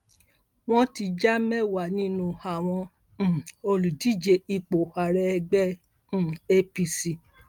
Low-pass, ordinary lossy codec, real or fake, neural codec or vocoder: 19.8 kHz; Opus, 32 kbps; real; none